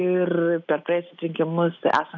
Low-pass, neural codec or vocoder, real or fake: 7.2 kHz; none; real